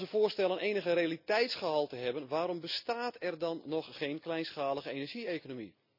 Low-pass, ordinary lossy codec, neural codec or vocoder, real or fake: 5.4 kHz; none; none; real